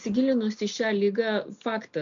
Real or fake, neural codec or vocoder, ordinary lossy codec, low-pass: real; none; MP3, 64 kbps; 7.2 kHz